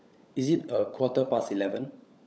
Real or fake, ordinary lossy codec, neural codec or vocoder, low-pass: fake; none; codec, 16 kHz, 16 kbps, FunCodec, trained on LibriTTS, 50 frames a second; none